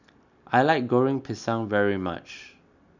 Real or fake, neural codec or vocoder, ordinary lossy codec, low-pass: real; none; none; 7.2 kHz